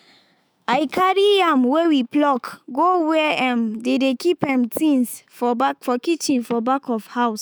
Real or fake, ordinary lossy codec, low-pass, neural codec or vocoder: fake; none; none; autoencoder, 48 kHz, 128 numbers a frame, DAC-VAE, trained on Japanese speech